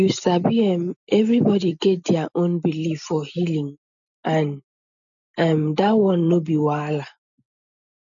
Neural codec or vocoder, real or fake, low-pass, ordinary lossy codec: none; real; 7.2 kHz; none